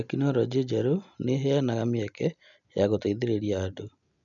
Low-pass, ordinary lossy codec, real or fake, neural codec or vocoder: 7.2 kHz; none; real; none